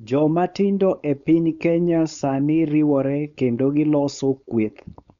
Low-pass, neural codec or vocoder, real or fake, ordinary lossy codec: 7.2 kHz; codec, 16 kHz, 4.8 kbps, FACodec; fake; none